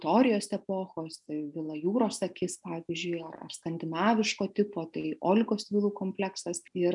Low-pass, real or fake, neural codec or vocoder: 10.8 kHz; real; none